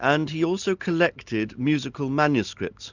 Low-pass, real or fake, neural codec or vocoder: 7.2 kHz; real; none